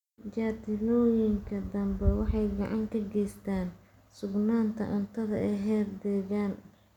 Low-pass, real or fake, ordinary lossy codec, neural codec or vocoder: 19.8 kHz; real; none; none